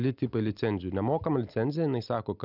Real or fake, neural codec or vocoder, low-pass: real; none; 5.4 kHz